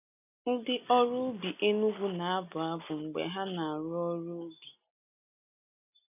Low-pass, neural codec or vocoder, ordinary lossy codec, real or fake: 3.6 kHz; none; none; real